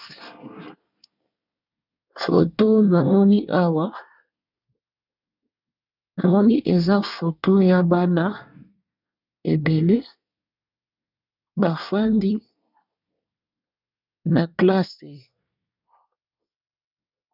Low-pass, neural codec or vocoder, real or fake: 5.4 kHz; codec, 24 kHz, 1 kbps, SNAC; fake